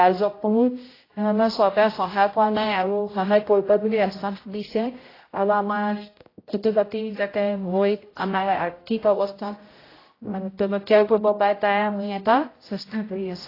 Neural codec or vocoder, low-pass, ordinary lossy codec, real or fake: codec, 16 kHz, 0.5 kbps, X-Codec, HuBERT features, trained on general audio; 5.4 kHz; AAC, 24 kbps; fake